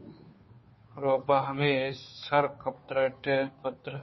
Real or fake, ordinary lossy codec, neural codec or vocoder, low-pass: fake; MP3, 24 kbps; codec, 16 kHz, 2 kbps, FunCodec, trained on Chinese and English, 25 frames a second; 7.2 kHz